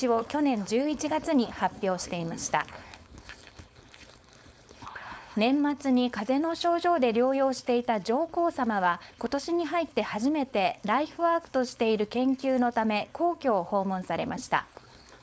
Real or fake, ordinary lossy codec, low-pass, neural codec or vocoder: fake; none; none; codec, 16 kHz, 4.8 kbps, FACodec